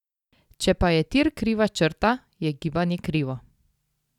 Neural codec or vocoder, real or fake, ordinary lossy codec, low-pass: none; real; none; 19.8 kHz